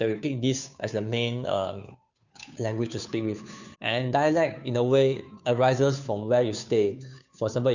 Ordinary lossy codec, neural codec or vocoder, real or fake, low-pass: none; codec, 16 kHz, 2 kbps, FunCodec, trained on Chinese and English, 25 frames a second; fake; 7.2 kHz